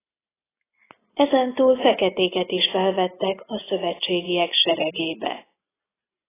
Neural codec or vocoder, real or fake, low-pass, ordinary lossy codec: none; real; 3.6 kHz; AAC, 16 kbps